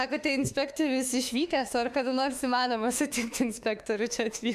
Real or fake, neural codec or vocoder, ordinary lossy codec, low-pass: fake; autoencoder, 48 kHz, 32 numbers a frame, DAC-VAE, trained on Japanese speech; AAC, 96 kbps; 14.4 kHz